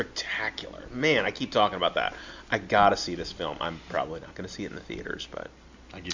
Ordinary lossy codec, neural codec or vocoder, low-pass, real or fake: MP3, 64 kbps; none; 7.2 kHz; real